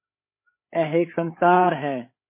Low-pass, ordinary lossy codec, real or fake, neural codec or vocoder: 3.6 kHz; MP3, 24 kbps; fake; codec, 16 kHz, 8 kbps, FreqCodec, larger model